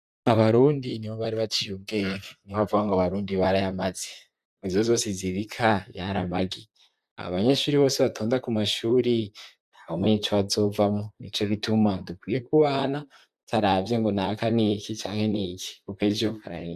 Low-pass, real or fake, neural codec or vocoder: 14.4 kHz; fake; vocoder, 44.1 kHz, 128 mel bands, Pupu-Vocoder